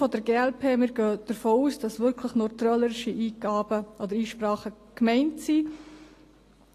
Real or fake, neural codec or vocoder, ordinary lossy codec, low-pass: real; none; AAC, 48 kbps; 14.4 kHz